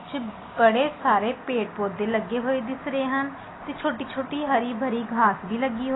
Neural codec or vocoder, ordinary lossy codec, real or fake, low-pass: none; AAC, 16 kbps; real; 7.2 kHz